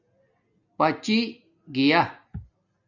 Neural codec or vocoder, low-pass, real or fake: none; 7.2 kHz; real